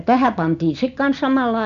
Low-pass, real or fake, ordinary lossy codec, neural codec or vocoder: 7.2 kHz; real; AAC, 96 kbps; none